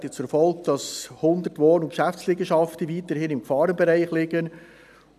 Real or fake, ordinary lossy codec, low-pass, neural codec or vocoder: real; none; 14.4 kHz; none